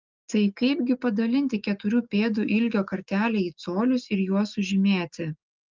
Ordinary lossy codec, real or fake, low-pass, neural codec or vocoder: Opus, 32 kbps; real; 7.2 kHz; none